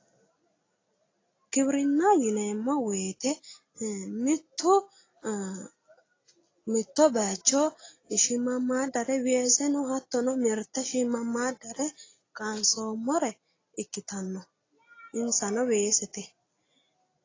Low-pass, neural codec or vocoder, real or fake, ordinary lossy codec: 7.2 kHz; none; real; AAC, 32 kbps